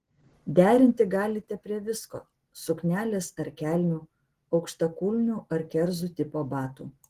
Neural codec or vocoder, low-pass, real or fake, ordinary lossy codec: none; 14.4 kHz; real; Opus, 16 kbps